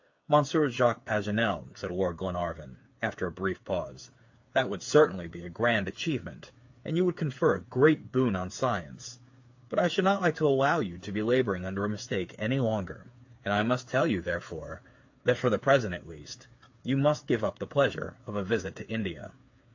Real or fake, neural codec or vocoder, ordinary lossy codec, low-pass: fake; codec, 16 kHz, 8 kbps, FreqCodec, smaller model; AAC, 48 kbps; 7.2 kHz